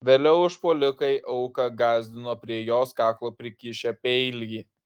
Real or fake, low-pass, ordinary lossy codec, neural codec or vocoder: fake; 19.8 kHz; Opus, 24 kbps; autoencoder, 48 kHz, 128 numbers a frame, DAC-VAE, trained on Japanese speech